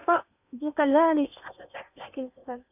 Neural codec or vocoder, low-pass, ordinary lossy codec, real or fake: codec, 16 kHz in and 24 kHz out, 0.8 kbps, FocalCodec, streaming, 65536 codes; 3.6 kHz; none; fake